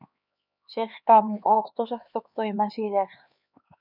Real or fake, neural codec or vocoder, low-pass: fake; codec, 16 kHz, 2 kbps, X-Codec, HuBERT features, trained on LibriSpeech; 5.4 kHz